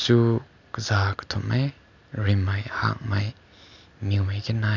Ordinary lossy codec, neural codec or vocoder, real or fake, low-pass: none; none; real; 7.2 kHz